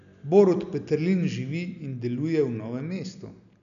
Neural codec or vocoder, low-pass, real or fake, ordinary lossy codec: none; 7.2 kHz; real; none